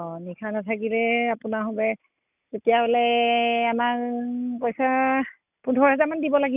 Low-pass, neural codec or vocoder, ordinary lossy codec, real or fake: 3.6 kHz; none; none; real